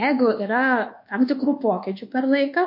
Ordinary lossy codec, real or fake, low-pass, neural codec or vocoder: MP3, 32 kbps; fake; 5.4 kHz; codec, 24 kHz, 1.2 kbps, DualCodec